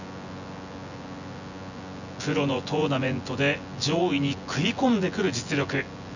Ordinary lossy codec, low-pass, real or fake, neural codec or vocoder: none; 7.2 kHz; fake; vocoder, 24 kHz, 100 mel bands, Vocos